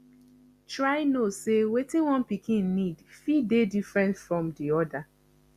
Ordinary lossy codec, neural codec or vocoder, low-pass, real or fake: Opus, 64 kbps; none; 14.4 kHz; real